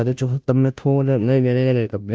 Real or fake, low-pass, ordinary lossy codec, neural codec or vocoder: fake; none; none; codec, 16 kHz, 0.5 kbps, FunCodec, trained on Chinese and English, 25 frames a second